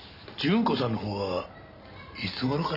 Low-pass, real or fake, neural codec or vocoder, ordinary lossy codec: 5.4 kHz; real; none; none